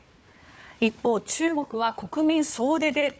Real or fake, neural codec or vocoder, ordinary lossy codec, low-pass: fake; codec, 16 kHz, 4 kbps, FunCodec, trained on Chinese and English, 50 frames a second; none; none